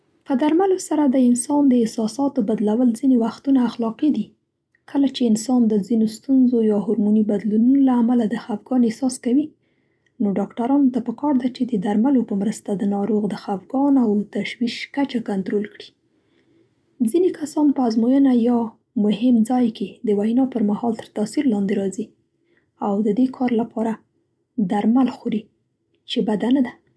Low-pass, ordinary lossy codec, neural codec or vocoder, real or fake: none; none; none; real